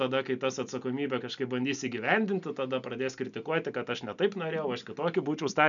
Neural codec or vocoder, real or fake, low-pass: none; real; 7.2 kHz